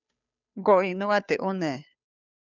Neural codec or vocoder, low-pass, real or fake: codec, 16 kHz, 2 kbps, FunCodec, trained on Chinese and English, 25 frames a second; 7.2 kHz; fake